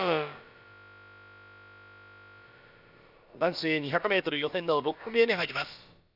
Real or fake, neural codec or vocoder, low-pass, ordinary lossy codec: fake; codec, 16 kHz, about 1 kbps, DyCAST, with the encoder's durations; 5.4 kHz; none